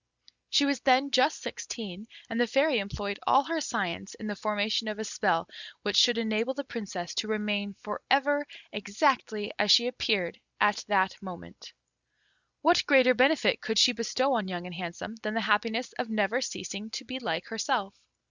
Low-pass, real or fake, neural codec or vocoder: 7.2 kHz; real; none